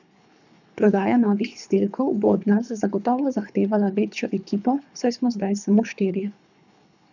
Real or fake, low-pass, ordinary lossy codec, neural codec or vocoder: fake; 7.2 kHz; none; codec, 24 kHz, 3 kbps, HILCodec